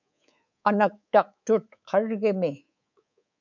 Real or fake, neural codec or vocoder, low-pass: fake; codec, 24 kHz, 3.1 kbps, DualCodec; 7.2 kHz